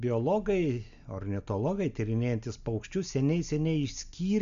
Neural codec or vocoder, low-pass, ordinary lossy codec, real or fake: none; 7.2 kHz; MP3, 64 kbps; real